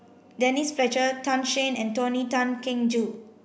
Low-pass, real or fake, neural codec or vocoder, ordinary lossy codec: none; real; none; none